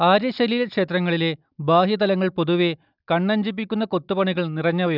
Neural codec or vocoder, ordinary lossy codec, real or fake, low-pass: none; none; real; 5.4 kHz